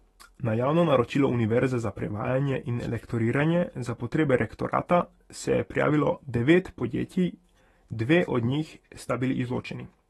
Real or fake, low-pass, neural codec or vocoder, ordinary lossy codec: fake; 19.8 kHz; autoencoder, 48 kHz, 128 numbers a frame, DAC-VAE, trained on Japanese speech; AAC, 32 kbps